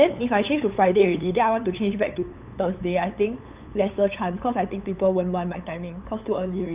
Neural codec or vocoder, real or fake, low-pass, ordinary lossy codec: codec, 16 kHz, 8 kbps, FunCodec, trained on LibriTTS, 25 frames a second; fake; 3.6 kHz; Opus, 64 kbps